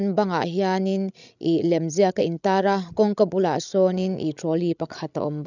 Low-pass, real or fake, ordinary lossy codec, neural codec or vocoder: 7.2 kHz; fake; none; codec, 16 kHz, 16 kbps, FreqCodec, larger model